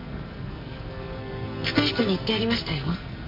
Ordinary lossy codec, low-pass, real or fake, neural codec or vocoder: MP3, 32 kbps; 5.4 kHz; fake; codec, 44.1 kHz, 2.6 kbps, SNAC